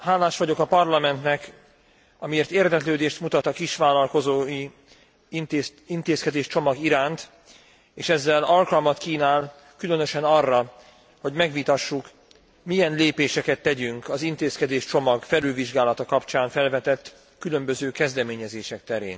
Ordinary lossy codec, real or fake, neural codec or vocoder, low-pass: none; real; none; none